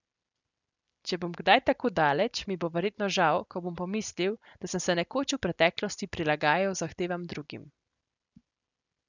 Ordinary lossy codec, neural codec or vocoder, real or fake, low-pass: none; none; real; 7.2 kHz